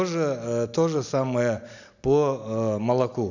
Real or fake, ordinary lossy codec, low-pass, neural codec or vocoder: real; none; 7.2 kHz; none